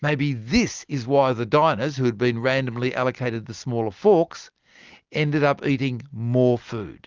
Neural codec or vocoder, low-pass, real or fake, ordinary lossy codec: none; 7.2 kHz; real; Opus, 24 kbps